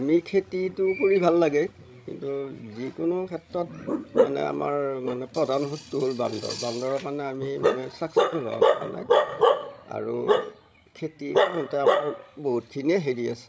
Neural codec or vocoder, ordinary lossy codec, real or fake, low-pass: codec, 16 kHz, 16 kbps, FreqCodec, larger model; none; fake; none